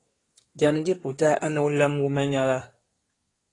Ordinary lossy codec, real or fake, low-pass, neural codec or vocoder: AAC, 32 kbps; fake; 10.8 kHz; codec, 24 kHz, 1 kbps, SNAC